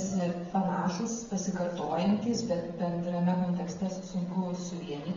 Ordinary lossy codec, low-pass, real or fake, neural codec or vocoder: AAC, 24 kbps; 7.2 kHz; fake; codec, 16 kHz, 16 kbps, FreqCodec, smaller model